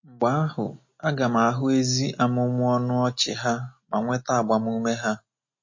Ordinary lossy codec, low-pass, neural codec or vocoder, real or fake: MP3, 32 kbps; 7.2 kHz; none; real